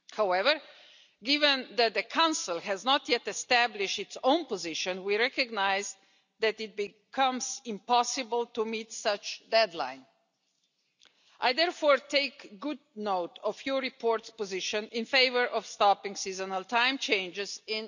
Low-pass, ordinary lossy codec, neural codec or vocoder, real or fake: 7.2 kHz; none; none; real